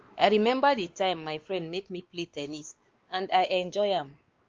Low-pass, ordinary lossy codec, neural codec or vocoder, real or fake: 7.2 kHz; Opus, 32 kbps; codec, 16 kHz, 2 kbps, X-Codec, WavLM features, trained on Multilingual LibriSpeech; fake